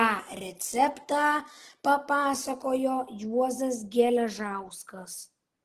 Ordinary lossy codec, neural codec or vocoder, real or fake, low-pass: Opus, 16 kbps; none; real; 14.4 kHz